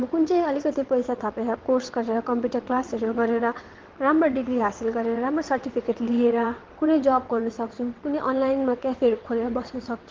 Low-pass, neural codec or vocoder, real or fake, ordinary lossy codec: 7.2 kHz; vocoder, 22.05 kHz, 80 mel bands, WaveNeXt; fake; Opus, 16 kbps